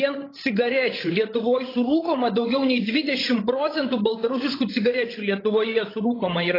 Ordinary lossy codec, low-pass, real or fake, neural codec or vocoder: AAC, 24 kbps; 5.4 kHz; fake; codec, 16 kHz, 16 kbps, FreqCodec, larger model